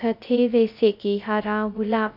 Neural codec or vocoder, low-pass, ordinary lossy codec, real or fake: codec, 16 kHz, 0.2 kbps, FocalCodec; 5.4 kHz; none; fake